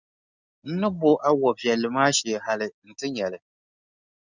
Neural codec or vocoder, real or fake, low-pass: none; real; 7.2 kHz